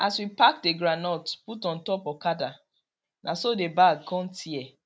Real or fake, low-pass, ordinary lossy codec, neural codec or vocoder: real; none; none; none